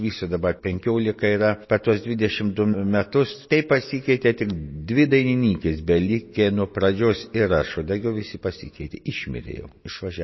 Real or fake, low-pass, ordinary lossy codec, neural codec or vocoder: real; 7.2 kHz; MP3, 24 kbps; none